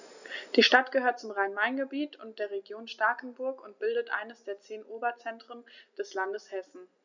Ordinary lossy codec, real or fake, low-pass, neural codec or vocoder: none; real; 7.2 kHz; none